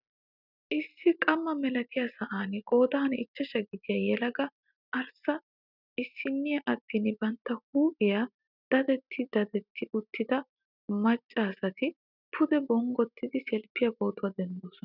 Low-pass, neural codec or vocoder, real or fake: 5.4 kHz; none; real